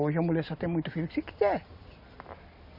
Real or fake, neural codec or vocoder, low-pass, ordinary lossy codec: real; none; 5.4 kHz; MP3, 48 kbps